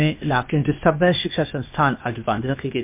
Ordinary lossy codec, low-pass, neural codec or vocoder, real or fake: MP3, 32 kbps; 3.6 kHz; codec, 16 kHz, 0.8 kbps, ZipCodec; fake